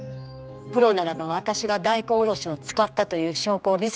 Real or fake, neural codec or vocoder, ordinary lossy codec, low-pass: fake; codec, 16 kHz, 2 kbps, X-Codec, HuBERT features, trained on general audio; none; none